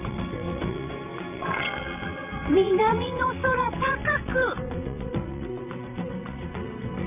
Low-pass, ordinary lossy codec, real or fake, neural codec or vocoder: 3.6 kHz; none; fake; vocoder, 22.05 kHz, 80 mel bands, WaveNeXt